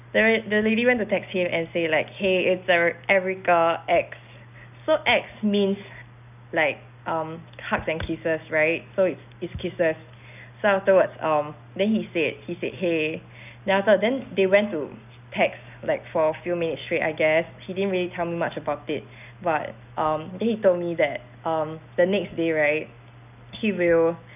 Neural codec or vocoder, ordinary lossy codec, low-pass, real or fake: none; none; 3.6 kHz; real